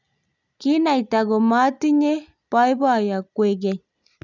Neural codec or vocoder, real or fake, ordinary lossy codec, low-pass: none; real; none; 7.2 kHz